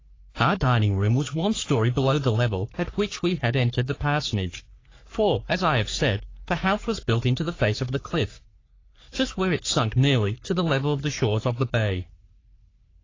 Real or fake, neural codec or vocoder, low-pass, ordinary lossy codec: fake; codec, 44.1 kHz, 3.4 kbps, Pupu-Codec; 7.2 kHz; AAC, 32 kbps